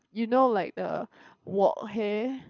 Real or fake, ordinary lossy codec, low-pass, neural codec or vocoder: fake; none; 7.2 kHz; codec, 24 kHz, 6 kbps, HILCodec